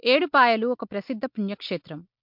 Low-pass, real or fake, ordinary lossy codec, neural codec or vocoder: 5.4 kHz; real; MP3, 48 kbps; none